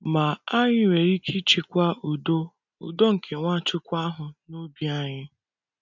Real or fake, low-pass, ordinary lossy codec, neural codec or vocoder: real; 7.2 kHz; none; none